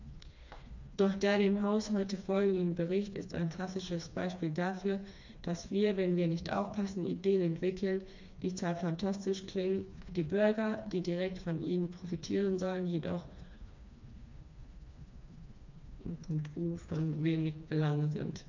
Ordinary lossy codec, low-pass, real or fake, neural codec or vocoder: MP3, 64 kbps; 7.2 kHz; fake; codec, 16 kHz, 2 kbps, FreqCodec, smaller model